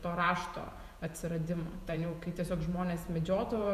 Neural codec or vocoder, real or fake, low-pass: vocoder, 48 kHz, 128 mel bands, Vocos; fake; 14.4 kHz